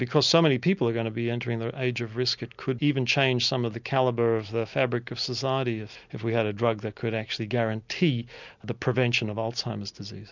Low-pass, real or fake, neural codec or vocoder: 7.2 kHz; real; none